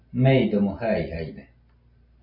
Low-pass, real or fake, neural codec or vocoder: 5.4 kHz; real; none